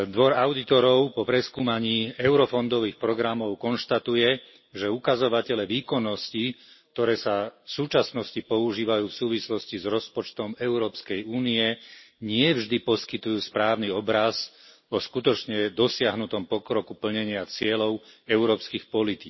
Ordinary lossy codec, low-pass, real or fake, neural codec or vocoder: MP3, 24 kbps; 7.2 kHz; real; none